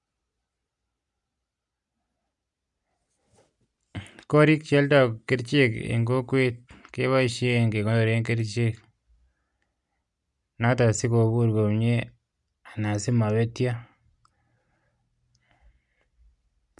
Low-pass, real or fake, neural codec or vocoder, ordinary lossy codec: 10.8 kHz; real; none; none